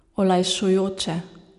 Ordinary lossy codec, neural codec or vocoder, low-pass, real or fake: none; none; 10.8 kHz; real